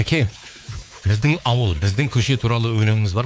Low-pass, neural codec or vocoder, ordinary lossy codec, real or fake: none; codec, 16 kHz, 4 kbps, X-Codec, WavLM features, trained on Multilingual LibriSpeech; none; fake